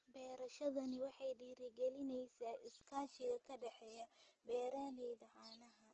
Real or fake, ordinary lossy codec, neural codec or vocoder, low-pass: real; Opus, 16 kbps; none; 7.2 kHz